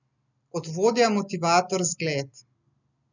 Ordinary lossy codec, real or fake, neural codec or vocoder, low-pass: none; real; none; 7.2 kHz